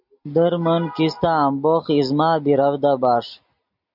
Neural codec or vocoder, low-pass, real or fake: none; 5.4 kHz; real